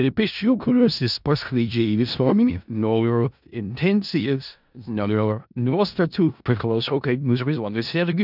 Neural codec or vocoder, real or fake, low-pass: codec, 16 kHz in and 24 kHz out, 0.4 kbps, LongCat-Audio-Codec, four codebook decoder; fake; 5.4 kHz